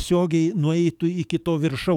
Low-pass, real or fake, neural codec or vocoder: 19.8 kHz; fake; codec, 44.1 kHz, 7.8 kbps, DAC